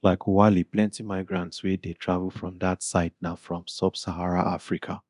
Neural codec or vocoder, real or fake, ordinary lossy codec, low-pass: codec, 24 kHz, 0.9 kbps, DualCodec; fake; none; 10.8 kHz